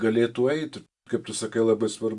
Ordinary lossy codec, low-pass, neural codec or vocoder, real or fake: Opus, 64 kbps; 10.8 kHz; none; real